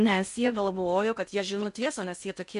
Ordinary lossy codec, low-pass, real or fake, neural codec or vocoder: MP3, 64 kbps; 10.8 kHz; fake; codec, 16 kHz in and 24 kHz out, 0.6 kbps, FocalCodec, streaming, 2048 codes